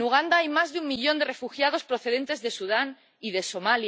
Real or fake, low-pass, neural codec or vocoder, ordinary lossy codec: real; none; none; none